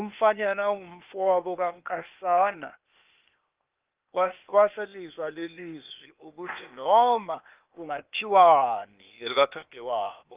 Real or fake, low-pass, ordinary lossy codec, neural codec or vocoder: fake; 3.6 kHz; Opus, 32 kbps; codec, 16 kHz, 0.8 kbps, ZipCodec